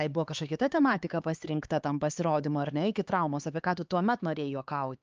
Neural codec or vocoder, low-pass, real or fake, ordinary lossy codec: codec, 16 kHz, 4 kbps, X-Codec, HuBERT features, trained on LibriSpeech; 7.2 kHz; fake; Opus, 24 kbps